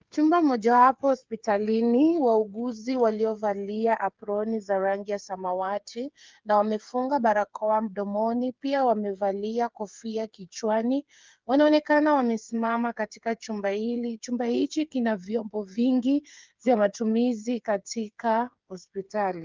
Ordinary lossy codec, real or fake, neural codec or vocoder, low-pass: Opus, 32 kbps; fake; codec, 16 kHz, 8 kbps, FreqCodec, smaller model; 7.2 kHz